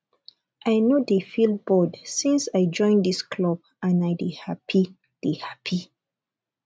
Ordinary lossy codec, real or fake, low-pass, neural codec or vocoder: none; real; none; none